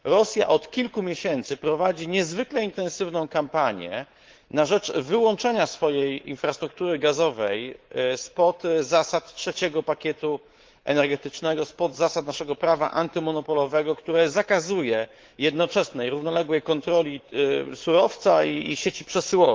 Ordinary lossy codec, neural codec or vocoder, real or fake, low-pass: Opus, 16 kbps; codec, 24 kHz, 3.1 kbps, DualCodec; fake; 7.2 kHz